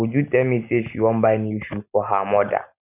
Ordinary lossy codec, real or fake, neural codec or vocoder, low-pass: none; real; none; 3.6 kHz